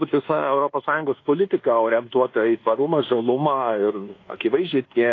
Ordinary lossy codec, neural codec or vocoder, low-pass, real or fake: AAC, 32 kbps; codec, 24 kHz, 1.2 kbps, DualCodec; 7.2 kHz; fake